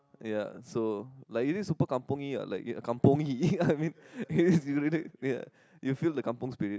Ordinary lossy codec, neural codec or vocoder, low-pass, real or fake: none; none; none; real